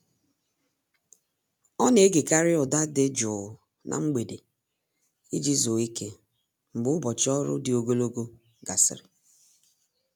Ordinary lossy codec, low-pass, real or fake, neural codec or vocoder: none; none; real; none